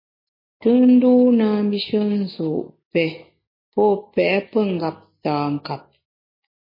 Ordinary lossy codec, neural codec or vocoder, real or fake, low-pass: MP3, 24 kbps; none; real; 5.4 kHz